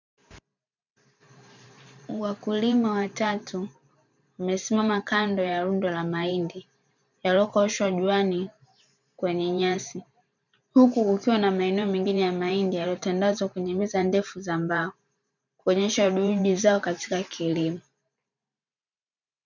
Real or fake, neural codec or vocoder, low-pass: fake; vocoder, 44.1 kHz, 128 mel bands every 512 samples, BigVGAN v2; 7.2 kHz